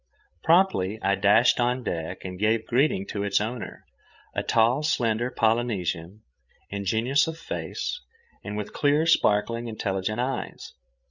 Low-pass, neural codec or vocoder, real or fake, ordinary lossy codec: 7.2 kHz; codec, 16 kHz, 16 kbps, FreqCodec, larger model; fake; Opus, 64 kbps